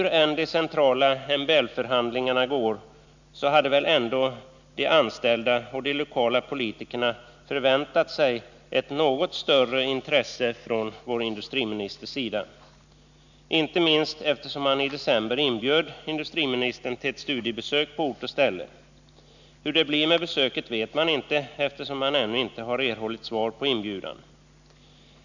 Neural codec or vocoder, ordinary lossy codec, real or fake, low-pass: none; none; real; 7.2 kHz